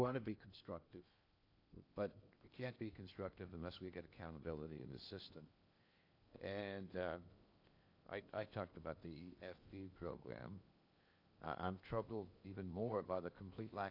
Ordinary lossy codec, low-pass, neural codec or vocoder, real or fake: AAC, 48 kbps; 5.4 kHz; codec, 16 kHz in and 24 kHz out, 0.8 kbps, FocalCodec, streaming, 65536 codes; fake